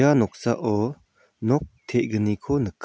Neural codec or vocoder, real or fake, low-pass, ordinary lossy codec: none; real; none; none